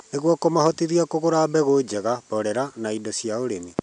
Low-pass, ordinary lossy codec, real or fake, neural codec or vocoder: 9.9 kHz; none; real; none